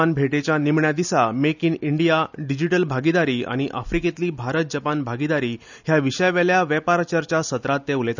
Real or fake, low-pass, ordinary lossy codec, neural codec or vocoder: real; 7.2 kHz; none; none